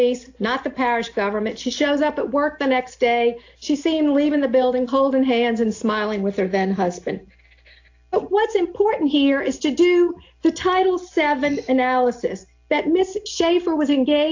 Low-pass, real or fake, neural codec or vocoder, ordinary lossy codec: 7.2 kHz; real; none; AAC, 48 kbps